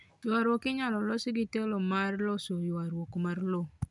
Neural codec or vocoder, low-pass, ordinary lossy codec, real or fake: none; 10.8 kHz; none; real